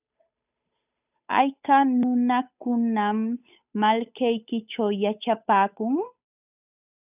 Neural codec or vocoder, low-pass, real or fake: codec, 16 kHz, 8 kbps, FunCodec, trained on Chinese and English, 25 frames a second; 3.6 kHz; fake